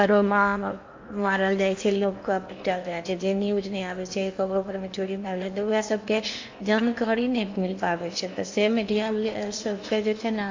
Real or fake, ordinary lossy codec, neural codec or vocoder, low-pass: fake; AAC, 48 kbps; codec, 16 kHz in and 24 kHz out, 0.8 kbps, FocalCodec, streaming, 65536 codes; 7.2 kHz